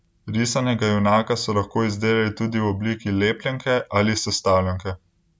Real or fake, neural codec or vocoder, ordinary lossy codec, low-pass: real; none; none; none